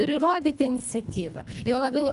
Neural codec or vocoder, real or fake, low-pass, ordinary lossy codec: codec, 24 kHz, 1.5 kbps, HILCodec; fake; 10.8 kHz; MP3, 96 kbps